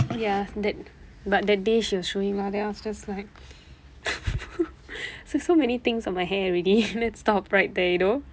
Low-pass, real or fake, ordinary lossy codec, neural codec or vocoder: none; real; none; none